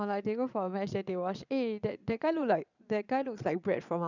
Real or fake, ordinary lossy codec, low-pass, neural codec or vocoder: fake; none; 7.2 kHz; vocoder, 22.05 kHz, 80 mel bands, Vocos